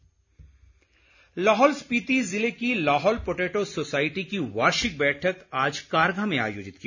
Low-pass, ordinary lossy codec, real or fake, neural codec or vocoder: 7.2 kHz; none; real; none